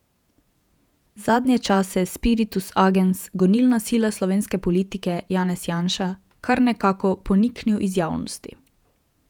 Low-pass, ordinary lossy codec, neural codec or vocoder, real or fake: 19.8 kHz; none; vocoder, 48 kHz, 128 mel bands, Vocos; fake